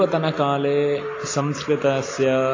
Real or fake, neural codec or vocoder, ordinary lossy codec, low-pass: real; none; AAC, 32 kbps; 7.2 kHz